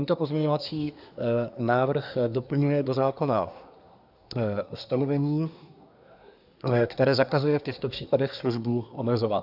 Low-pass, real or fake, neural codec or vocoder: 5.4 kHz; fake; codec, 24 kHz, 1 kbps, SNAC